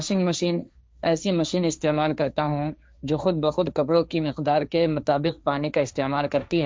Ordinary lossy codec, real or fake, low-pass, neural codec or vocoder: none; fake; none; codec, 16 kHz, 1.1 kbps, Voila-Tokenizer